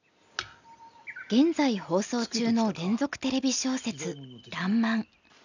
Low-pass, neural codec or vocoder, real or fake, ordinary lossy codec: 7.2 kHz; none; real; none